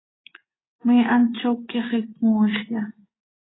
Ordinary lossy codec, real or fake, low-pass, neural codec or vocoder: AAC, 16 kbps; fake; 7.2 kHz; vocoder, 24 kHz, 100 mel bands, Vocos